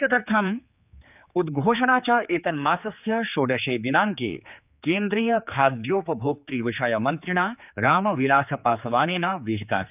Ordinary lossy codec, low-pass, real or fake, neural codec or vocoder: none; 3.6 kHz; fake; codec, 16 kHz, 4 kbps, X-Codec, HuBERT features, trained on general audio